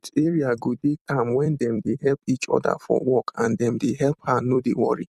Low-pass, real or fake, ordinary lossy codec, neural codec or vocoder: 14.4 kHz; fake; none; vocoder, 44.1 kHz, 128 mel bands every 256 samples, BigVGAN v2